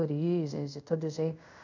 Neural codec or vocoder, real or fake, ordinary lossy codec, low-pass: codec, 24 kHz, 0.5 kbps, DualCodec; fake; none; 7.2 kHz